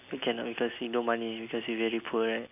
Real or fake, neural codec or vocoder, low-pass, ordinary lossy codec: real; none; 3.6 kHz; none